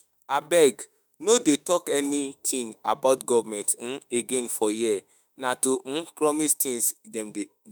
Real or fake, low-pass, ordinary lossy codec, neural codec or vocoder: fake; none; none; autoencoder, 48 kHz, 32 numbers a frame, DAC-VAE, trained on Japanese speech